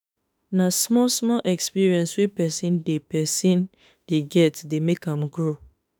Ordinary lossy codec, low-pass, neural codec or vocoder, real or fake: none; none; autoencoder, 48 kHz, 32 numbers a frame, DAC-VAE, trained on Japanese speech; fake